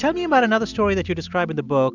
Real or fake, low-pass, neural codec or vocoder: real; 7.2 kHz; none